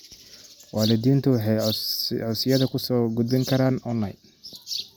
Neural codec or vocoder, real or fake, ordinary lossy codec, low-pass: none; real; none; none